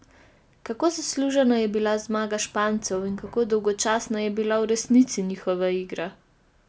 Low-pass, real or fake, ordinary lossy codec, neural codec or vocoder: none; real; none; none